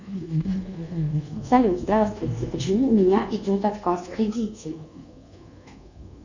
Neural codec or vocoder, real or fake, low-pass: codec, 24 kHz, 1.2 kbps, DualCodec; fake; 7.2 kHz